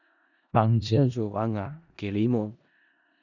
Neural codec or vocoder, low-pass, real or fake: codec, 16 kHz in and 24 kHz out, 0.4 kbps, LongCat-Audio-Codec, four codebook decoder; 7.2 kHz; fake